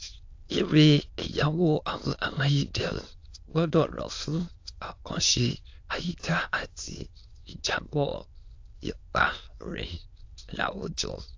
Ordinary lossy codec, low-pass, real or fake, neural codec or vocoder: AAC, 48 kbps; 7.2 kHz; fake; autoencoder, 22.05 kHz, a latent of 192 numbers a frame, VITS, trained on many speakers